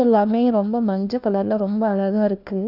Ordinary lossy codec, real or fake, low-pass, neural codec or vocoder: none; fake; 5.4 kHz; codec, 16 kHz, 1 kbps, FunCodec, trained on LibriTTS, 50 frames a second